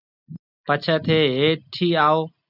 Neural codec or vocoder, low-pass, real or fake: none; 5.4 kHz; real